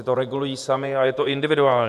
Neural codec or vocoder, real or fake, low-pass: vocoder, 48 kHz, 128 mel bands, Vocos; fake; 14.4 kHz